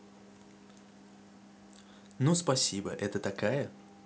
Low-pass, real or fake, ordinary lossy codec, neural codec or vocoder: none; real; none; none